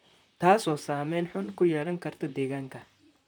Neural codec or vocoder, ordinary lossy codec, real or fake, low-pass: vocoder, 44.1 kHz, 128 mel bands, Pupu-Vocoder; none; fake; none